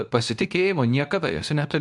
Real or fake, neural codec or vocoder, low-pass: fake; codec, 24 kHz, 0.9 kbps, WavTokenizer, medium speech release version 2; 10.8 kHz